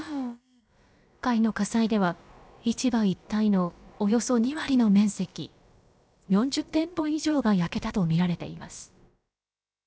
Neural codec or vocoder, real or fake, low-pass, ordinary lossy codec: codec, 16 kHz, about 1 kbps, DyCAST, with the encoder's durations; fake; none; none